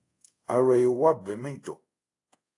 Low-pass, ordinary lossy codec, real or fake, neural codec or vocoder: 10.8 kHz; AAC, 48 kbps; fake; codec, 24 kHz, 0.5 kbps, DualCodec